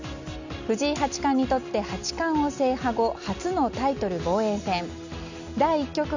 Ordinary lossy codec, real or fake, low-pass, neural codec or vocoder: none; real; 7.2 kHz; none